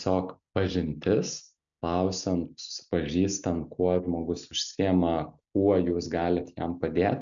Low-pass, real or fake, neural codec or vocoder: 7.2 kHz; real; none